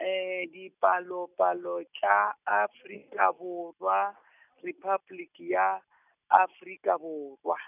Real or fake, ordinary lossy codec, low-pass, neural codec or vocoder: real; none; 3.6 kHz; none